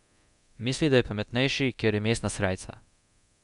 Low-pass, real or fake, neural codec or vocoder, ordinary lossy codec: 10.8 kHz; fake; codec, 24 kHz, 0.9 kbps, DualCodec; none